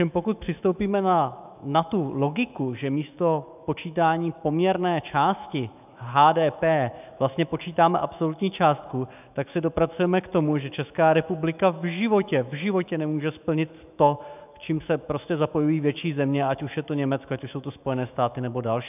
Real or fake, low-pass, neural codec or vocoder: fake; 3.6 kHz; autoencoder, 48 kHz, 128 numbers a frame, DAC-VAE, trained on Japanese speech